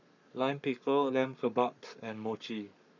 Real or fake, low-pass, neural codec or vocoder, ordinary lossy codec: fake; 7.2 kHz; codec, 44.1 kHz, 7.8 kbps, Pupu-Codec; none